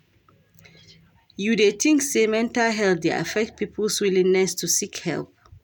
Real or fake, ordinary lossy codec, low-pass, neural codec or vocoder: real; none; none; none